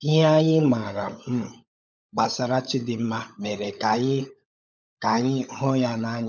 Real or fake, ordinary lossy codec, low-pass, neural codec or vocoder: fake; none; 7.2 kHz; codec, 16 kHz, 16 kbps, FunCodec, trained on LibriTTS, 50 frames a second